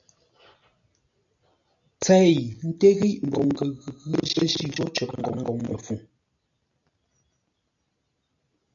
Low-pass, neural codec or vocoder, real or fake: 7.2 kHz; none; real